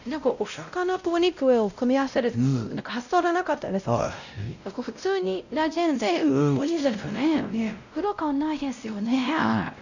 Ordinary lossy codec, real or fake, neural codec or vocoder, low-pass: none; fake; codec, 16 kHz, 0.5 kbps, X-Codec, WavLM features, trained on Multilingual LibriSpeech; 7.2 kHz